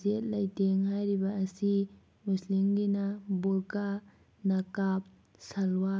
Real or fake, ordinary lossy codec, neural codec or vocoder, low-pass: real; none; none; none